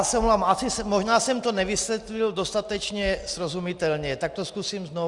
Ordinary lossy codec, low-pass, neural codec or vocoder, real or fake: Opus, 64 kbps; 10.8 kHz; none; real